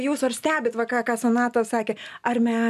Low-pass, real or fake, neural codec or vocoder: 14.4 kHz; real; none